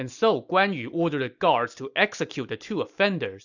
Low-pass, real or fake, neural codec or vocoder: 7.2 kHz; real; none